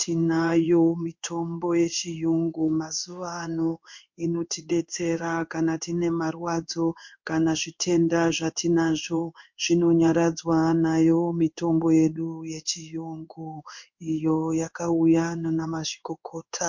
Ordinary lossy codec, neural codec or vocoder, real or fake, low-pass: MP3, 48 kbps; codec, 16 kHz in and 24 kHz out, 1 kbps, XY-Tokenizer; fake; 7.2 kHz